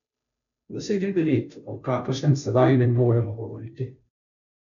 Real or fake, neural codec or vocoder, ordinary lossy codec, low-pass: fake; codec, 16 kHz, 0.5 kbps, FunCodec, trained on Chinese and English, 25 frames a second; none; 7.2 kHz